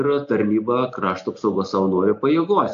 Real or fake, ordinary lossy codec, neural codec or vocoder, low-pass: real; AAC, 64 kbps; none; 7.2 kHz